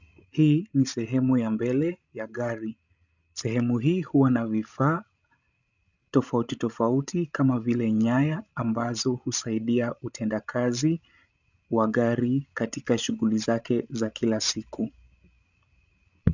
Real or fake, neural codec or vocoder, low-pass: fake; codec, 16 kHz, 16 kbps, FreqCodec, larger model; 7.2 kHz